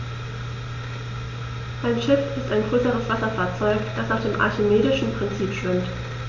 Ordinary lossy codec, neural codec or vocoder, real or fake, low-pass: MP3, 64 kbps; none; real; 7.2 kHz